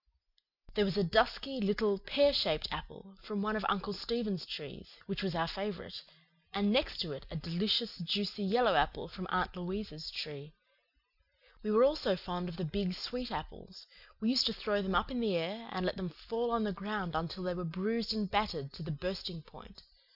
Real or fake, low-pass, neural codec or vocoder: real; 5.4 kHz; none